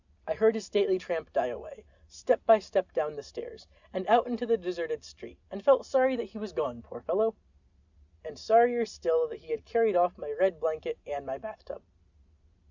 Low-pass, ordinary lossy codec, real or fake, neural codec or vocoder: 7.2 kHz; Opus, 64 kbps; real; none